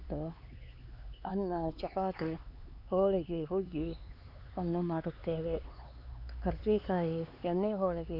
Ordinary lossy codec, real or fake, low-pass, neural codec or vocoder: AAC, 48 kbps; fake; 5.4 kHz; codec, 16 kHz, 4 kbps, X-Codec, HuBERT features, trained on LibriSpeech